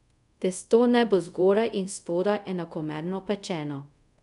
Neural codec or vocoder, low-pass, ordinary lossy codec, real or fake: codec, 24 kHz, 0.5 kbps, DualCodec; 10.8 kHz; none; fake